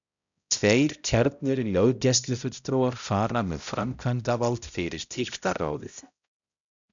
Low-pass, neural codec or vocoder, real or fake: 7.2 kHz; codec, 16 kHz, 0.5 kbps, X-Codec, HuBERT features, trained on balanced general audio; fake